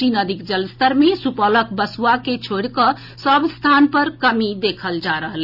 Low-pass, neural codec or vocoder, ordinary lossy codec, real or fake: 5.4 kHz; none; none; real